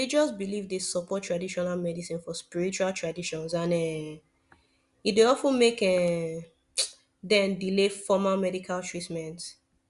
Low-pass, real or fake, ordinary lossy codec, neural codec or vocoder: 10.8 kHz; real; Opus, 64 kbps; none